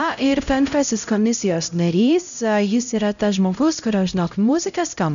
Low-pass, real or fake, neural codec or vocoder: 7.2 kHz; fake; codec, 16 kHz, 0.5 kbps, X-Codec, HuBERT features, trained on LibriSpeech